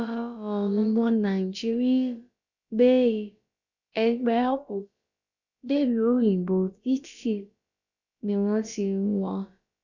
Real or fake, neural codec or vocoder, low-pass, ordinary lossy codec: fake; codec, 16 kHz, about 1 kbps, DyCAST, with the encoder's durations; 7.2 kHz; none